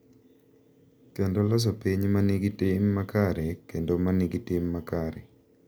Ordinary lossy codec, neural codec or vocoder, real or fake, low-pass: none; none; real; none